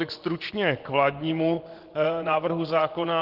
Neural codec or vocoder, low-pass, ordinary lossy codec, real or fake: vocoder, 24 kHz, 100 mel bands, Vocos; 5.4 kHz; Opus, 16 kbps; fake